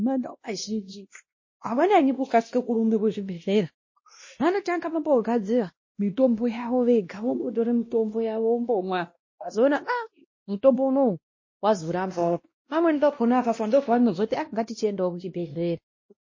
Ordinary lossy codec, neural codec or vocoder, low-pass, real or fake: MP3, 32 kbps; codec, 16 kHz, 1 kbps, X-Codec, WavLM features, trained on Multilingual LibriSpeech; 7.2 kHz; fake